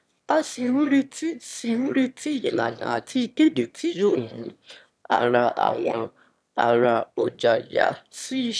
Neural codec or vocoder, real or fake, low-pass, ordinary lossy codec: autoencoder, 22.05 kHz, a latent of 192 numbers a frame, VITS, trained on one speaker; fake; none; none